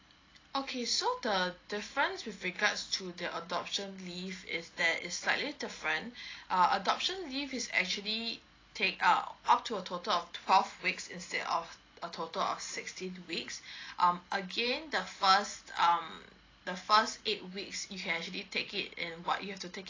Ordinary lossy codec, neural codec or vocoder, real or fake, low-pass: AAC, 32 kbps; none; real; 7.2 kHz